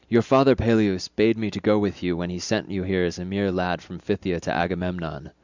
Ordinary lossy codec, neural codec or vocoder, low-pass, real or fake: Opus, 64 kbps; none; 7.2 kHz; real